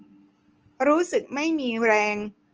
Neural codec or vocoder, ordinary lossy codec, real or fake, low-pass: none; Opus, 24 kbps; real; 7.2 kHz